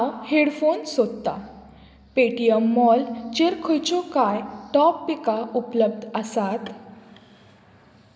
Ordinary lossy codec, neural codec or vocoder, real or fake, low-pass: none; none; real; none